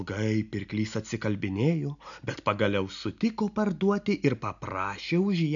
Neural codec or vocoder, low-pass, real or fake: none; 7.2 kHz; real